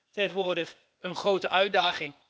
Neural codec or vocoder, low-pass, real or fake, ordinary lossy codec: codec, 16 kHz, 0.8 kbps, ZipCodec; none; fake; none